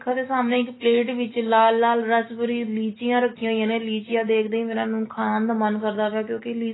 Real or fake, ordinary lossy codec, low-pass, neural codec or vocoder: real; AAC, 16 kbps; 7.2 kHz; none